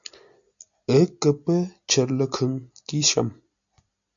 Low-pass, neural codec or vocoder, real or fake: 7.2 kHz; none; real